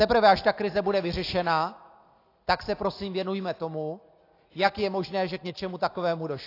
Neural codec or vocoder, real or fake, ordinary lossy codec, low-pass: none; real; AAC, 32 kbps; 5.4 kHz